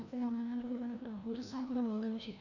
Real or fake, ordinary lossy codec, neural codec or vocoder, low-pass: fake; none; codec, 16 kHz, 1 kbps, FreqCodec, larger model; 7.2 kHz